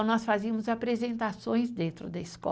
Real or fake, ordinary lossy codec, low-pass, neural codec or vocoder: real; none; none; none